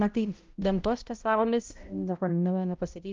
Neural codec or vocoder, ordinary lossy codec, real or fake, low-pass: codec, 16 kHz, 0.5 kbps, X-Codec, HuBERT features, trained on balanced general audio; Opus, 32 kbps; fake; 7.2 kHz